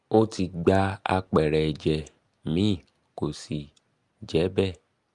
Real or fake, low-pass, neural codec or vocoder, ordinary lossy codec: real; 10.8 kHz; none; Opus, 32 kbps